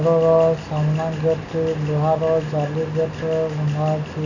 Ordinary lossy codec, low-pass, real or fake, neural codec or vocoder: none; 7.2 kHz; real; none